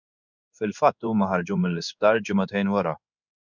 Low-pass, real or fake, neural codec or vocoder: 7.2 kHz; fake; codec, 16 kHz in and 24 kHz out, 1 kbps, XY-Tokenizer